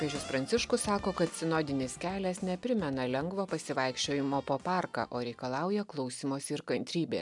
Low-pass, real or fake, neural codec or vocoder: 10.8 kHz; real; none